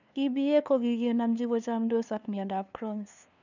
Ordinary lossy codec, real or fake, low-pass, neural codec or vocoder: none; fake; 7.2 kHz; codec, 16 kHz, 2 kbps, FunCodec, trained on LibriTTS, 25 frames a second